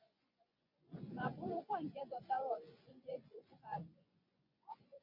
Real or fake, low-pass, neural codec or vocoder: fake; 5.4 kHz; vocoder, 24 kHz, 100 mel bands, Vocos